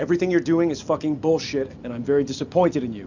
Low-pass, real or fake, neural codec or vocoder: 7.2 kHz; real; none